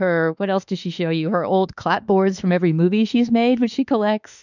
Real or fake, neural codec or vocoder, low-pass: fake; autoencoder, 48 kHz, 32 numbers a frame, DAC-VAE, trained on Japanese speech; 7.2 kHz